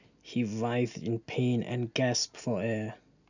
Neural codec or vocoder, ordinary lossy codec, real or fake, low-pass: none; none; real; 7.2 kHz